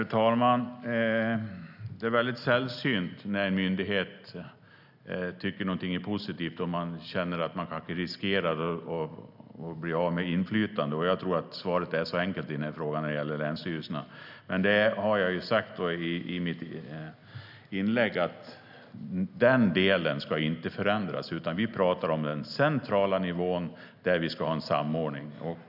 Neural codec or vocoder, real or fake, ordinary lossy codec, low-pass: none; real; none; 5.4 kHz